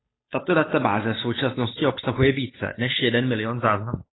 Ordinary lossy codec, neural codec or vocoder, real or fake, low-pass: AAC, 16 kbps; codec, 16 kHz, 8 kbps, FunCodec, trained on Chinese and English, 25 frames a second; fake; 7.2 kHz